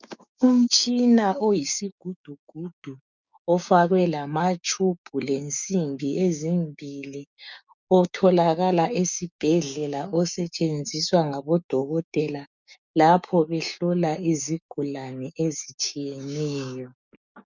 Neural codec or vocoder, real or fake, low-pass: codec, 16 kHz, 6 kbps, DAC; fake; 7.2 kHz